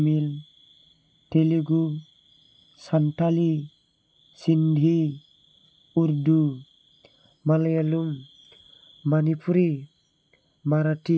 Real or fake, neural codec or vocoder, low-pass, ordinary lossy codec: real; none; none; none